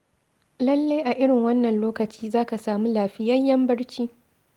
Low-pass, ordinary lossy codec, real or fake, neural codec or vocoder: 19.8 kHz; Opus, 24 kbps; real; none